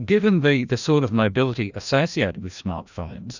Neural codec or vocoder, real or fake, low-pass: codec, 16 kHz, 1 kbps, FreqCodec, larger model; fake; 7.2 kHz